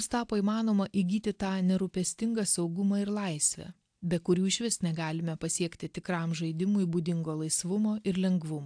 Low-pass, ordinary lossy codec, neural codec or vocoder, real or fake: 9.9 kHz; AAC, 64 kbps; none; real